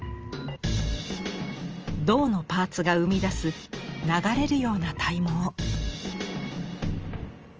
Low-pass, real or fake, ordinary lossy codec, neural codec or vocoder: 7.2 kHz; real; Opus, 24 kbps; none